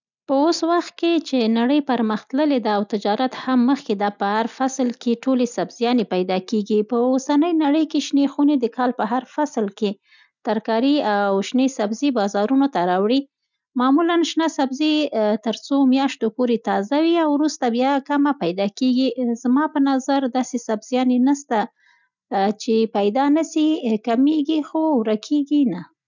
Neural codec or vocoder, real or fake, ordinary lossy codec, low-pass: none; real; none; 7.2 kHz